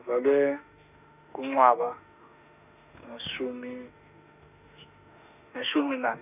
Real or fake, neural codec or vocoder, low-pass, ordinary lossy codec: fake; autoencoder, 48 kHz, 32 numbers a frame, DAC-VAE, trained on Japanese speech; 3.6 kHz; none